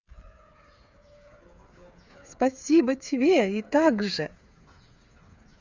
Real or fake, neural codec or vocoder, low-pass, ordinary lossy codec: fake; codec, 16 kHz, 8 kbps, FreqCodec, smaller model; 7.2 kHz; Opus, 64 kbps